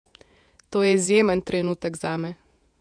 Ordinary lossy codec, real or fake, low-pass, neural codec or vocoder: none; fake; 9.9 kHz; vocoder, 48 kHz, 128 mel bands, Vocos